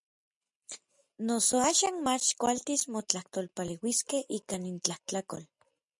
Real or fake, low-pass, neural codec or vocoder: real; 10.8 kHz; none